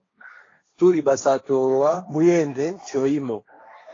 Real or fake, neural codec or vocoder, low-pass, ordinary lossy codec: fake; codec, 16 kHz, 1.1 kbps, Voila-Tokenizer; 7.2 kHz; AAC, 32 kbps